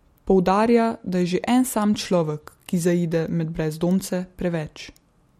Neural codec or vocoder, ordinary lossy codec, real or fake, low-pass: none; MP3, 64 kbps; real; 19.8 kHz